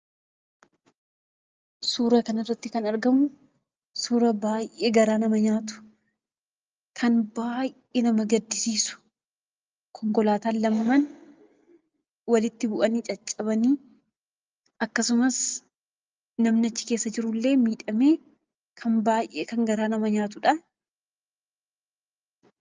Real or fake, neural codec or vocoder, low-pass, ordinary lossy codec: real; none; 7.2 kHz; Opus, 24 kbps